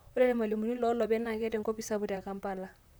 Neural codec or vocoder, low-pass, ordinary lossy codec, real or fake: vocoder, 44.1 kHz, 128 mel bands, Pupu-Vocoder; none; none; fake